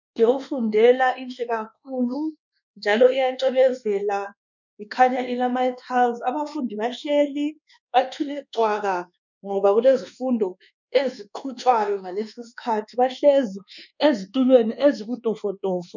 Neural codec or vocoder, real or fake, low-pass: codec, 24 kHz, 1.2 kbps, DualCodec; fake; 7.2 kHz